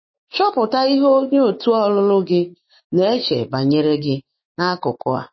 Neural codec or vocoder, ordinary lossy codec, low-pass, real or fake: autoencoder, 48 kHz, 128 numbers a frame, DAC-VAE, trained on Japanese speech; MP3, 24 kbps; 7.2 kHz; fake